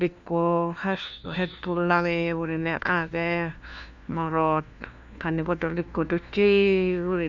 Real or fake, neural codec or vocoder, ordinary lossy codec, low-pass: fake; codec, 16 kHz, 1 kbps, FunCodec, trained on LibriTTS, 50 frames a second; none; 7.2 kHz